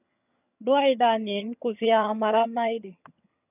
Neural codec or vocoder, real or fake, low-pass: vocoder, 22.05 kHz, 80 mel bands, HiFi-GAN; fake; 3.6 kHz